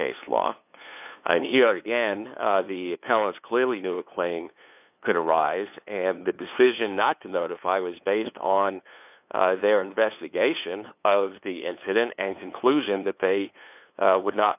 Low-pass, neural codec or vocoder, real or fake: 3.6 kHz; codec, 16 kHz, 2 kbps, FunCodec, trained on LibriTTS, 25 frames a second; fake